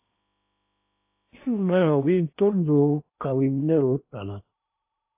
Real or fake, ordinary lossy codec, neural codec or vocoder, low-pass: fake; AAC, 32 kbps; codec, 16 kHz in and 24 kHz out, 0.8 kbps, FocalCodec, streaming, 65536 codes; 3.6 kHz